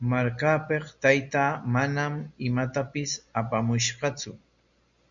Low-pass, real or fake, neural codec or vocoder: 7.2 kHz; real; none